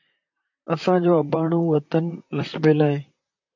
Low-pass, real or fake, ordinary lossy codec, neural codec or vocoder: 7.2 kHz; fake; MP3, 48 kbps; vocoder, 44.1 kHz, 128 mel bands, Pupu-Vocoder